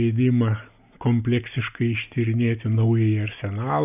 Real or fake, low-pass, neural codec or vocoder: real; 3.6 kHz; none